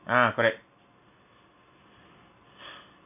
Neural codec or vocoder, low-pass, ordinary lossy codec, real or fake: none; 3.6 kHz; none; real